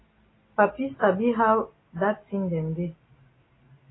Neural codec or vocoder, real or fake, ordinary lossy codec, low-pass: none; real; AAC, 16 kbps; 7.2 kHz